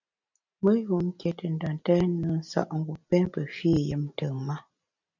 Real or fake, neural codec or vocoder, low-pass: real; none; 7.2 kHz